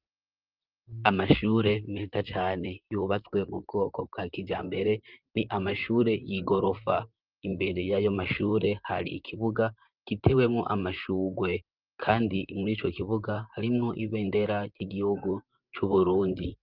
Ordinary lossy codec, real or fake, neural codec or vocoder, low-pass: Opus, 24 kbps; fake; vocoder, 44.1 kHz, 128 mel bands, Pupu-Vocoder; 5.4 kHz